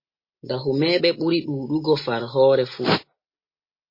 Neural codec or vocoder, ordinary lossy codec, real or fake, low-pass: none; MP3, 24 kbps; real; 5.4 kHz